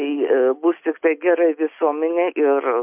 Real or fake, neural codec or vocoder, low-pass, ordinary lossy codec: real; none; 3.6 kHz; MP3, 32 kbps